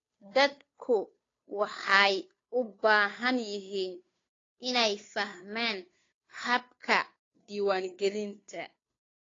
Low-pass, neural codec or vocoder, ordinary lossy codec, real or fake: 7.2 kHz; codec, 16 kHz, 2 kbps, FunCodec, trained on Chinese and English, 25 frames a second; AAC, 32 kbps; fake